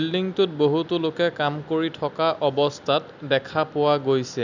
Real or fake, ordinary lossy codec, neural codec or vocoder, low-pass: real; none; none; 7.2 kHz